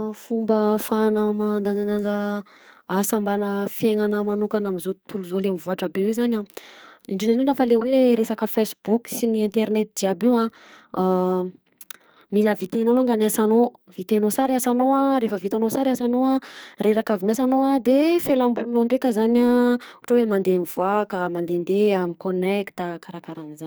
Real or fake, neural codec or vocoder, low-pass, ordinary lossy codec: fake; codec, 44.1 kHz, 2.6 kbps, SNAC; none; none